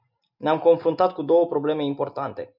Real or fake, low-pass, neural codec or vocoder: real; 5.4 kHz; none